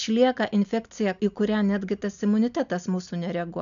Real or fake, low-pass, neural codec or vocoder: real; 7.2 kHz; none